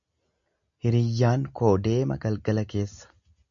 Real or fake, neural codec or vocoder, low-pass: real; none; 7.2 kHz